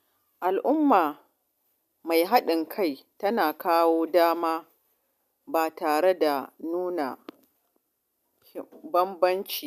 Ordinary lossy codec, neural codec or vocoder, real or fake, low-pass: none; none; real; 14.4 kHz